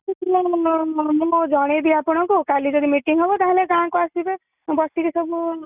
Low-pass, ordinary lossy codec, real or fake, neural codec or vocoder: 3.6 kHz; none; real; none